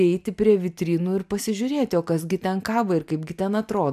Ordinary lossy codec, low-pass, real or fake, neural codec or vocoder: MP3, 96 kbps; 14.4 kHz; real; none